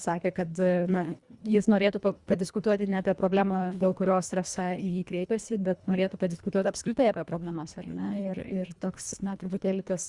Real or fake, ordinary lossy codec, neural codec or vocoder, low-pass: fake; Opus, 64 kbps; codec, 24 kHz, 1.5 kbps, HILCodec; 10.8 kHz